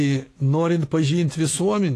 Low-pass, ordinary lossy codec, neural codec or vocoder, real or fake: 14.4 kHz; AAC, 48 kbps; autoencoder, 48 kHz, 128 numbers a frame, DAC-VAE, trained on Japanese speech; fake